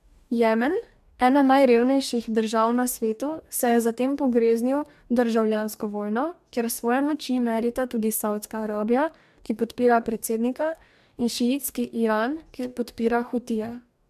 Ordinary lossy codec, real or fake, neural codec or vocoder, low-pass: MP3, 96 kbps; fake; codec, 44.1 kHz, 2.6 kbps, DAC; 14.4 kHz